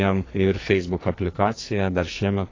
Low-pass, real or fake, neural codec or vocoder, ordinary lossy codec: 7.2 kHz; fake; codec, 44.1 kHz, 2.6 kbps, SNAC; AAC, 32 kbps